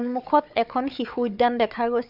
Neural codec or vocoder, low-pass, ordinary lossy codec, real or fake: codec, 16 kHz, 4 kbps, FunCodec, trained on Chinese and English, 50 frames a second; 5.4 kHz; none; fake